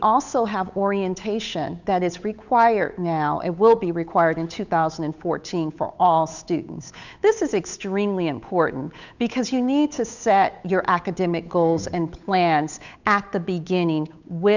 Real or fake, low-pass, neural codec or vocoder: fake; 7.2 kHz; codec, 16 kHz, 8 kbps, FunCodec, trained on Chinese and English, 25 frames a second